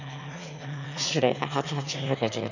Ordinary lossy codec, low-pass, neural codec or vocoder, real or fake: none; 7.2 kHz; autoencoder, 22.05 kHz, a latent of 192 numbers a frame, VITS, trained on one speaker; fake